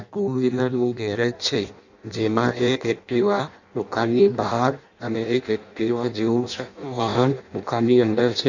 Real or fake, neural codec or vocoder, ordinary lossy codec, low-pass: fake; codec, 16 kHz in and 24 kHz out, 0.6 kbps, FireRedTTS-2 codec; none; 7.2 kHz